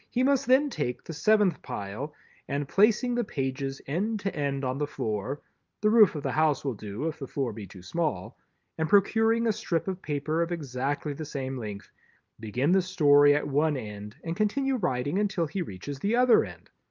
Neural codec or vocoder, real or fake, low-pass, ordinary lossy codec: none; real; 7.2 kHz; Opus, 24 kbps